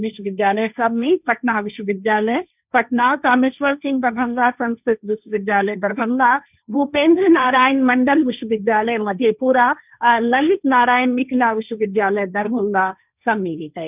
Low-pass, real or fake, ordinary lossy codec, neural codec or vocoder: 3.6 kHz; fake; none; codec, 16 kHz, 1.1 kbps, Voila-Tokenizer